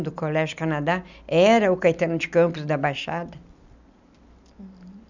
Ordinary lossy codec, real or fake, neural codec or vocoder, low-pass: none; real; none; 7.2 kHz